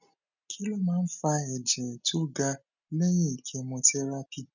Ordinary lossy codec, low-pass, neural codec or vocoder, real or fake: none; 7.2 kHz; none; real